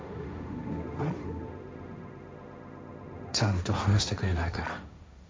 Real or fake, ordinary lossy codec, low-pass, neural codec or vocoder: fake; none; none; codec, 16 kHz, 1.1 kbps, Voila-Tokenizer